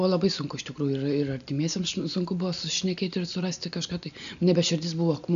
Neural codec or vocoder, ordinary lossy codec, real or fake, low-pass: none; AAC, 96 kbps; real; 7.2 kHz